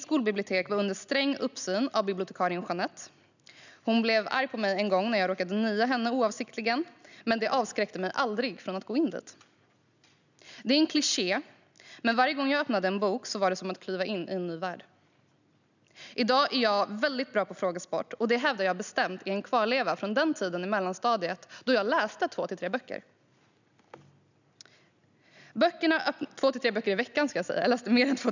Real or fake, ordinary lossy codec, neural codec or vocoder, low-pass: real; none; none; 7.2 kHz